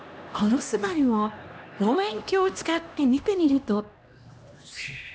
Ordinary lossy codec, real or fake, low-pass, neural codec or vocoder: none; fake; none; codec, 16 kHz, 1 kbps, X-Codec, HuBERT features, trained on LibriSpeech